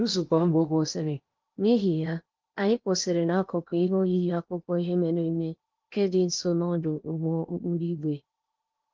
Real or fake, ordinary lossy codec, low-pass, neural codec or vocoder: fake; Opus, 24 kbps; 7.2 kHz; codec, 16 kHz in and 24 kHz out, 0.8 kbps, FocalCodec, streaming, 65536 codes